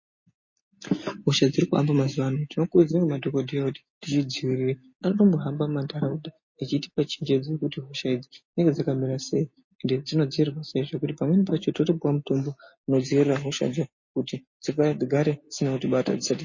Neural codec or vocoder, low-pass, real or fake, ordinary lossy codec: none; 7.2 kHz; real; MP3, 32 kbps